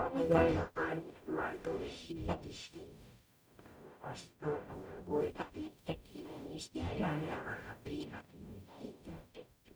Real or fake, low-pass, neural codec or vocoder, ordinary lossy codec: fake; none; codec, 44.1 kHz, 0.9 kbps, DAC; none